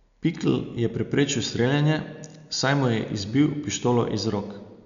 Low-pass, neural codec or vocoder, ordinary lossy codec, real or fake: 7.2 kHz; none; none; real